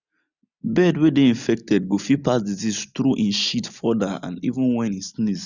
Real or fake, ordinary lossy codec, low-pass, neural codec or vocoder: real; none; 7.2 kHz; none